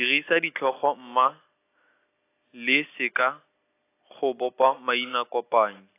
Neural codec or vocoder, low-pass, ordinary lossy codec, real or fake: none; 3.6 kHz; AAC, 24 kbps; real